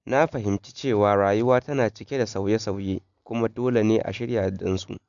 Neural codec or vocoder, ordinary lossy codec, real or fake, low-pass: none; none; real; 7.2 kHz